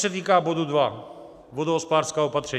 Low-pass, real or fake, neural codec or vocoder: 14.4 kHz; real; none